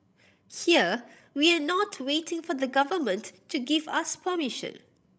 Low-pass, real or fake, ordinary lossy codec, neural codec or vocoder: none; fake; none; codec, 16 kHz, 16 kbps, FreqCodec, larger model